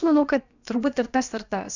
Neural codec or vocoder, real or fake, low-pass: codec, 16 kHz, about 1 kbps, DyCAST, with the encoder's durations; fake; 7.2 kHz